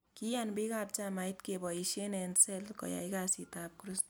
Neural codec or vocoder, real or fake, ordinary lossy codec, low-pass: none; real; none; none